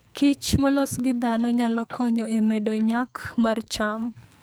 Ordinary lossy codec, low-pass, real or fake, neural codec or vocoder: none; none; fake; codec, 44.1 kHz, 2.6 kbps, SNAC